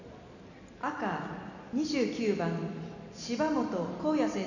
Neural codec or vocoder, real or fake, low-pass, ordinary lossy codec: none; real; 7.2 kHz; none